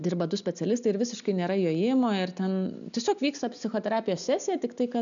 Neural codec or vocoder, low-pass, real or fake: none; 7.2 kHz; real